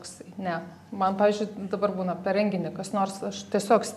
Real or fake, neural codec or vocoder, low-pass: real; none; 14.4 kHz